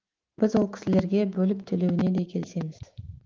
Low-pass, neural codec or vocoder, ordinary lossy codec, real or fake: 7.2 kHz; autoencoder, 48 kHz, 128 numbers a frame, DAC-VAE, trained on Japanese speech; Opus, 16 kbps; fake